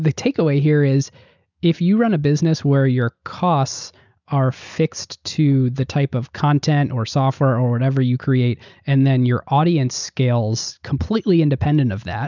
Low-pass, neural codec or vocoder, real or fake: 7.2 kHz; none; real